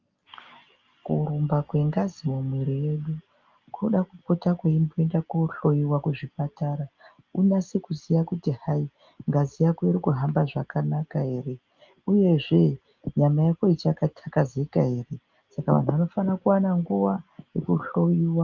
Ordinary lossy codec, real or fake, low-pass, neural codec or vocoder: Opus, 32 kbps; real; 7.2 kHz; none